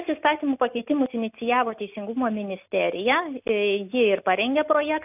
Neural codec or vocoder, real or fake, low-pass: none; real; 3.6 kHz